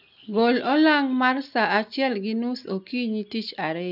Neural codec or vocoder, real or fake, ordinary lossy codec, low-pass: none; real; none; 5.4 kHz